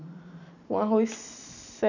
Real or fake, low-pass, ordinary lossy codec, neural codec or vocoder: real; 7.2 kHz; none; none